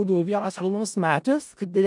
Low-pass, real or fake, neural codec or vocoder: 10.8 kHz; fake; codec, 16 kHz in and 24 kHz out, 0.4 kbps, LongCat-Audio-Codec, four codebook decoder